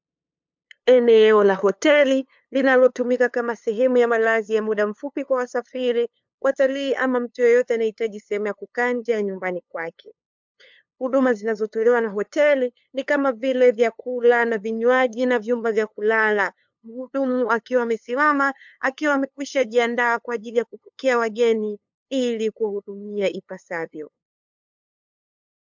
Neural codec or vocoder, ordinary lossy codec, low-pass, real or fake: codec, 16 kHz, 2 kbps, FunCodec, trained on LibriTTS, 25 frames a second; MP3, 64 kbps; 7.2 kHz; fake